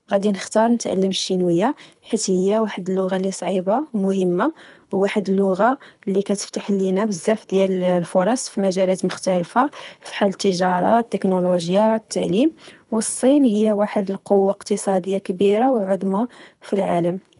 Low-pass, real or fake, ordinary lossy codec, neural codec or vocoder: 10.8 kHz; fake; none; codec, 24 kHz, 3 kbps, HILCodec